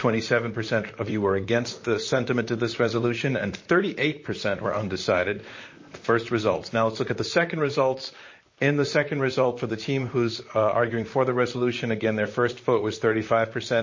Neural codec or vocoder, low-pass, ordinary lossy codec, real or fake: vocoder, 44.1 kHz, 128 mel bands, Pupu-Vocoder; 7.2 kHz; MP3, 32 kbps; fake